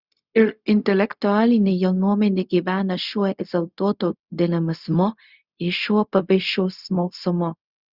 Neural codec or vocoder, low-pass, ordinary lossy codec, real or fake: codec, 16 kHz, 0.4 kbps, LongCat-Audio-Codec; 5.4 kHz; Opus, 64 kbps; fake